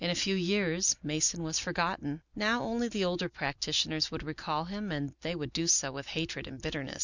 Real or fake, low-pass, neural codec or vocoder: real; 7.2 kHz; none